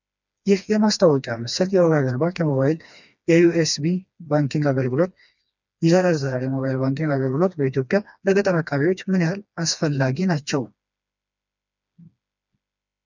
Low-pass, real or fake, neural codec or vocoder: 7.2 kHz; fake; codec, 16 kHz, 2 kbps, FreqCodec, smaller model